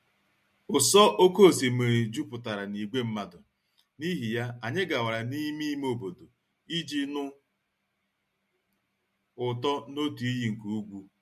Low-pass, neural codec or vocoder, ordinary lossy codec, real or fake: 14.4 kHz; none; MP3, 64 kbps; real